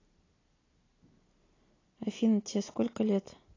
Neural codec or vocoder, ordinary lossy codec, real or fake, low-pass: none; AAC, 32 kbps; real; 7.2 kHz